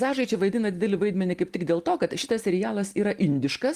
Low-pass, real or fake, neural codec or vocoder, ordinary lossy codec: 14.4 kHz; real; none; Opus, 24 kbps